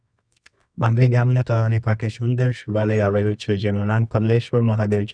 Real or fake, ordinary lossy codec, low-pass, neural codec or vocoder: fake; none; 9.9 kHz; codec, 24 kHz, 0.9 kbps, WavTokenizer, medium music audio release